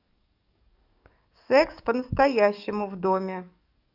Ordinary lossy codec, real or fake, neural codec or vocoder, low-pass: none; fake; codec, 44.1 kHz, 7.8 kbps, DAC; 5.4 kHz